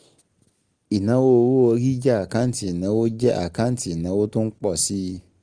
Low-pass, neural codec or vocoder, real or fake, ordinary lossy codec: 9.9 kHz; none; real; Opus, 24 kbps